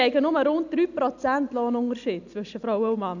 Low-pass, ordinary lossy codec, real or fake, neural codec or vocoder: 7.2 kHz; none; real; none